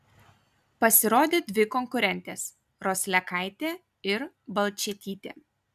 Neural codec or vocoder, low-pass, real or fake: none; 14.4 kHz; real